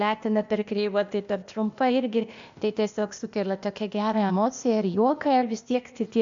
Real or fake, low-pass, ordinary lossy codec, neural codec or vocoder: fake; 7.2 kHz; MP3, 64 kbps; codec, 16 kHz, 0.8 kbps, ZipCodec